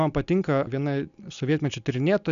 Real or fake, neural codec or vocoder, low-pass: real; none; 7.2 kHz